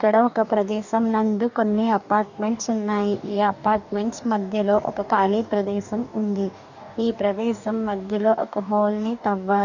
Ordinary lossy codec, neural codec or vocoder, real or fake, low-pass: none; codec, 44.1 kHz, 2.6 kbps, DAC; fake; 7.2 kHz